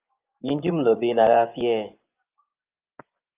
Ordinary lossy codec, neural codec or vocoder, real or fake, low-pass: Opus, 24 kbps; vocoder, 24 kHz, 100 mel bands, Vocos; fake; 3.6 kHz